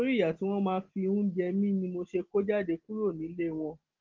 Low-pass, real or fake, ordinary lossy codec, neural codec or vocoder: 7.2 kHz; real; Opus, 16 kbps; none